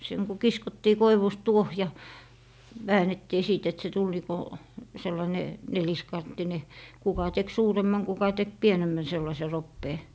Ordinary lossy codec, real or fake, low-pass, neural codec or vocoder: none; real; none; none